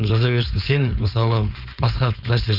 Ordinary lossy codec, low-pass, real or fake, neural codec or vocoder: none; 5.4 kHz; fake; codec, 16 kHz, 4.8 kbps, FACodec